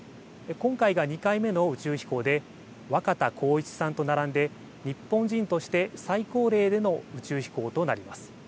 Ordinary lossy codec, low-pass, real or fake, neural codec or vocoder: none; none; real; none